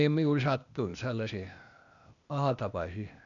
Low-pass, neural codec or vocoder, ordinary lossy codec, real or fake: 7.2 kHz; codec, 16 kHz, 0.8 kbps, ZipCodec; none; fake